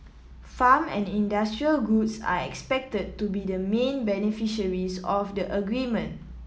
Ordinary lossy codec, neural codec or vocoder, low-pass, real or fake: none; none; none; real